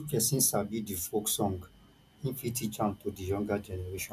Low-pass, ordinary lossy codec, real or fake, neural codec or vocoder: 14.4 kHz; AAC, 96 kbps; real; none